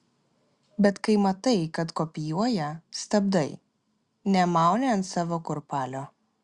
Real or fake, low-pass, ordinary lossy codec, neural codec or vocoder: real; 10.8 kHz; Opus, 64 kbps; none